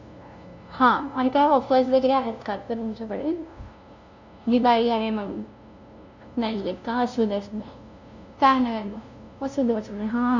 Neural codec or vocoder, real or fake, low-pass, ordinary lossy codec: codec, 16 kHz, 0.5 kbps, FunCodec, trained on LibriTTS, 25 frames a second; fake; 7.2 kHz; none